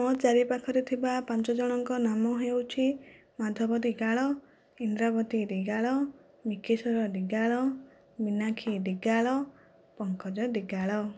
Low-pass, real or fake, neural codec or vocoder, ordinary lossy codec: none; real; none; none